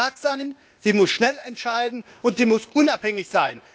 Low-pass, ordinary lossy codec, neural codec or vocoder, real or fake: none; none; codec, 16 kHz, 0.8 kbps, ZipCodec; fake